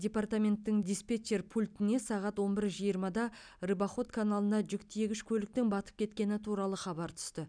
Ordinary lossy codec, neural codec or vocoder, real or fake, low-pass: none; none; real; 9.9 kHz